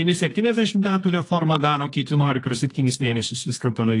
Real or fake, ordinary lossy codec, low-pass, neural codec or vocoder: fake; AAC, 48 kbps; 10.8 kHz; codec, 24 kHz, 0.9 kbps, WavTokenizer, medium music audio release